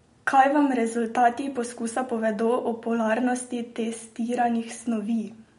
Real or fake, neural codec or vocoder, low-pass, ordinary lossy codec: real; none; 10.8 kHz; MP3, 48 kbps